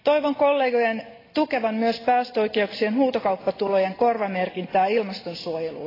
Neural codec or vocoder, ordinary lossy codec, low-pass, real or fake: none; AAC, 24 kbps; 5.4 kHz; real